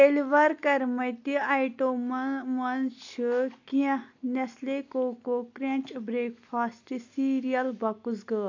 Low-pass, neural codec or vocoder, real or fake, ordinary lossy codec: 7.2 kHz; none; real; AAC, 48 kbps